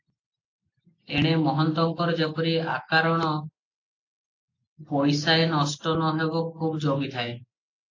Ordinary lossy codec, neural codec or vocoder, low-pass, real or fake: AAC, 48 kbps; none; 7.2 kHz; real